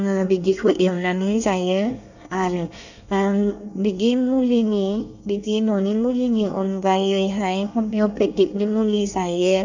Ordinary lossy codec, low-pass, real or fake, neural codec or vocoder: none; 7.2 kHz; fake; codec, 24 kHz, 1 kbps, SNAC